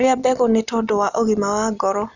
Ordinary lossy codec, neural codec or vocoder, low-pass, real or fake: none; none; 7.2 kHz; real